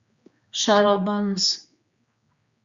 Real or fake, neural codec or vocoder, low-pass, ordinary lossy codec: fake; codec, 16 kHz, 2 kbps, X-Codec, HuBERT features, trained on general audio; 7.2 kHz; Opus, 64 kbps